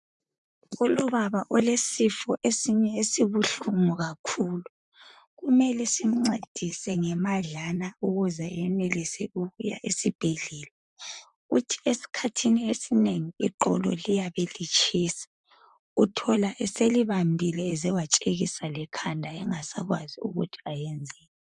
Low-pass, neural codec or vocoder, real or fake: 10.8 kHz; vocoder, 44.1 kHz, 128 mel bands, Pupu-Vocoder; fake